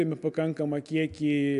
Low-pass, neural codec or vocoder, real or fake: 10.8 kHz; none; real